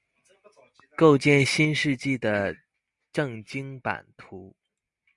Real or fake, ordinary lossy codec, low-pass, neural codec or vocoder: real; Opus, 64 kbps; 10.8 kHz; none